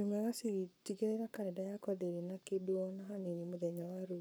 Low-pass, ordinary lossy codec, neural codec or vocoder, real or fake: none; none; codec, 44.1 kHz, 7.8 kbps, Pupu-Codec; fake